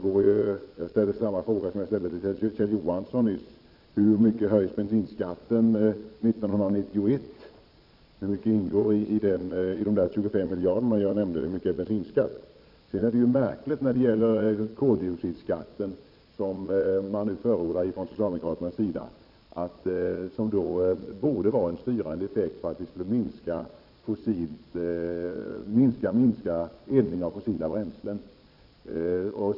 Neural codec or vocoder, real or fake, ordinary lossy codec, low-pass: vocoder, 22.05 kHz, 80 mel bands, Vocos; fake; none; 5.4 kHz